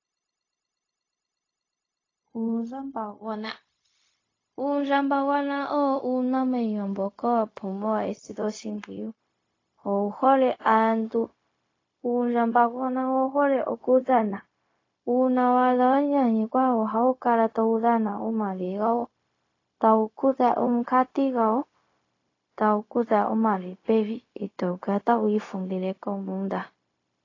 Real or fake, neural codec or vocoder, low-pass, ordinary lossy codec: fake; codec, 16 kHz, 0.4 kbps, LongCat-Audio-Codec; 7.2 kHz; AAC, 32 kbps